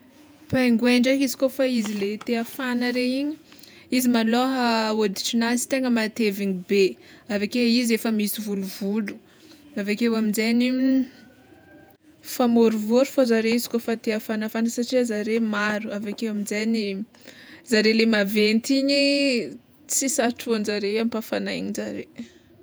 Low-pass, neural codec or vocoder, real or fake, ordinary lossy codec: none; vocoder, 48 kHz, 128 mel bands, Vocos; fake; none